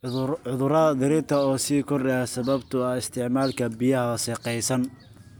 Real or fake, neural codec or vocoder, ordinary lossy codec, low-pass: real; none; none; none